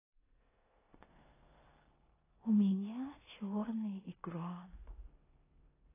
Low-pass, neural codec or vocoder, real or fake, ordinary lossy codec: 3.6 kHz; codec, 16 kHz in and 24 kHz out, 0.9 kbps, LongCat-Audio-Codec, four codebook decoder; fake; AAC, 16 kbps